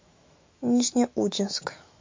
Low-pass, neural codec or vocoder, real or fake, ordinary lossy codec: 7.2 kHz; autoencoder, 48 kHz, 128 numbers a frame, DAC-VAE, trained on Japanese speech; fake; MP3, 48 kbps